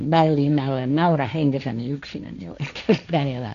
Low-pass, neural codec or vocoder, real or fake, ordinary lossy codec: 7.2 kHz; codec, 16 kHz, 1.1 kbps, Voila-Tokenizer; fake; none